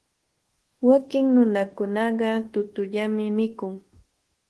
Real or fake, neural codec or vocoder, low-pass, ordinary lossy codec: fake; codec, 24 kHz, 1.2 kbps, DualCodec; 10.8 kHz; Opus, 16 kbps